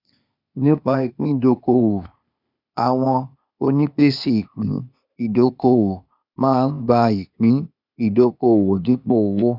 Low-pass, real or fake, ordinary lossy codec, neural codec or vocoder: 5.4 kHz; fake; none; codec, 16 kHz, 0.8 kbps, ZipCodec